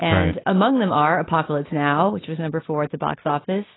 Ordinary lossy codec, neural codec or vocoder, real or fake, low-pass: AAC, 16 kbps; none; real; 7.2 kHz